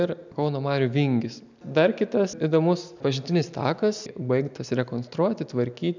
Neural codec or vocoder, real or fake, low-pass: none; real; 7.2 kHz